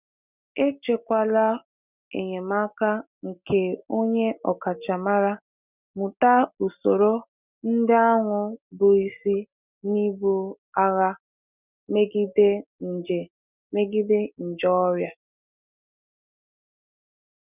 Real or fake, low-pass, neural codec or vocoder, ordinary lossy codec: real; 3.6 kHz; none; none